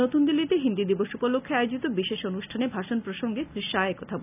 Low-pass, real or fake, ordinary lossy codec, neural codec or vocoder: 3.6 kHz; real; none; none